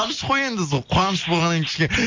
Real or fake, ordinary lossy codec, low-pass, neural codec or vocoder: real; MP3, 48 kbps; 7.2 kHz; none